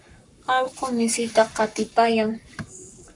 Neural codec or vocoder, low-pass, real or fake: codec, 44.1 kHz, 7.8 kbps, Pupu-Codec; 10.8 kHz; fake